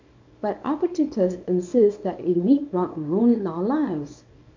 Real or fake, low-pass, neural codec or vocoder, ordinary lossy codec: fake; 7.2 kHz; codec, 24 kHz, 0.9 kbps, WavTokenizer, small release; MP3, 64 kbps